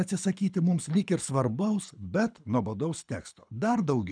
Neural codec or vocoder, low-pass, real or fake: codec, 24 kHz, 6 kbps, HILCodec; 9.9 kHz; fake